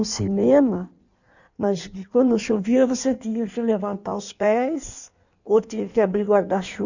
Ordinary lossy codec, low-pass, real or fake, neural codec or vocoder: none; 7.2 kHz; fake; codec, 16 kHz in and 24 kHz out, 1.1 kbps, FireRedTTS-2 codec